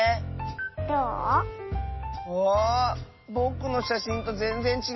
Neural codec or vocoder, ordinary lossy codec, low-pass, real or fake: none; MP3, 24 kbps; 7.2 kHz; real